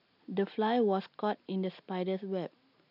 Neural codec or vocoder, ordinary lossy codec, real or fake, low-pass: none; none; real; 5.4 kHz